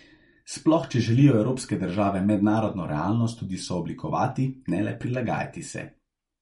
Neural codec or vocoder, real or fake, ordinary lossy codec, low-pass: none; real; MP3, 48 kbps; 19.8 kHz